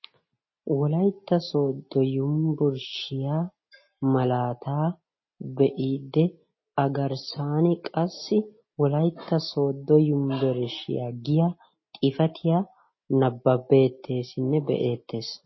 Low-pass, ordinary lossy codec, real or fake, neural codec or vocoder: 7.2 kHz; MP3, 24 kbps; real; none